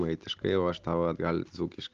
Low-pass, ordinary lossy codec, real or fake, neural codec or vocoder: 7.2 kHz; Opus, 32 kbps; real; none